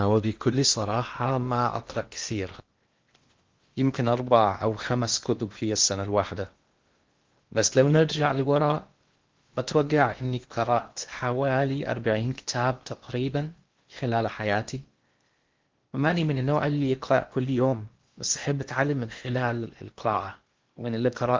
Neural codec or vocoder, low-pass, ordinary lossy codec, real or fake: codec, 16 kHz in and 24 kHz out, 0.8 kbps, FocalCodec, streaming, 65536 codes; 7.2 kHz; Opus, 32 kbps; fake